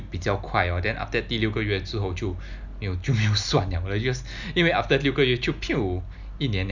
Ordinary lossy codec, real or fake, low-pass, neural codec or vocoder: none; real; 7.2 kHz; none